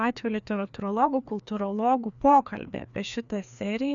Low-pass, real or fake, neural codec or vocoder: 7.2 kHz; fake; codec, 16 kHz, 2 kbps, FreqCodec, larger model